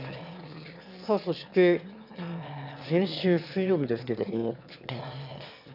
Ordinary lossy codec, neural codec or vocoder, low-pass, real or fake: none; autoencoder, 22.05 kHz, a latent of 192 numbers a frame, VITS, trained on one speaker; 5.4 kHz; fake